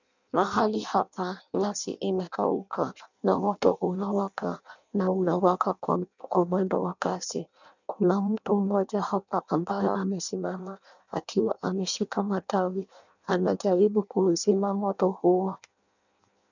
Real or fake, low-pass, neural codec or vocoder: fake; 7.2 kHz; codec, 16 kHz in and 24 kHz out, 0.6 kbps, FireRedTTS-2 codec